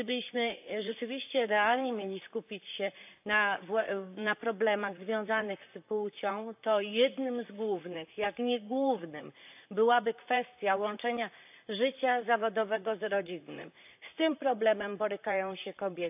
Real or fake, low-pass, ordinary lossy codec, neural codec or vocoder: fake; 3.6 kHz; AAC, 32 kbps; vocoder, 44.1 kHz, 128 mel bands, Pupu-Vocoder